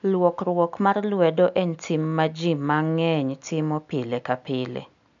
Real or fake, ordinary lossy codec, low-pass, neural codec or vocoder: real; none; 7.2 kHz; none